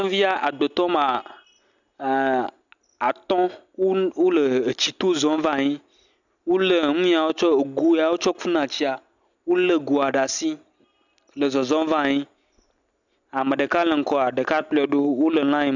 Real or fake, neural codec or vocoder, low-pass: real; none; 7.2 kHz